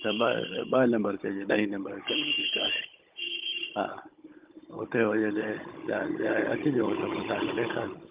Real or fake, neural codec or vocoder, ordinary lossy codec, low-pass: fake; codec, 16 kHz, 16 kbps, FunCodec, trained on Chinese and English, 50 frames a second; Opus, 32 kbps; 3.6 kHz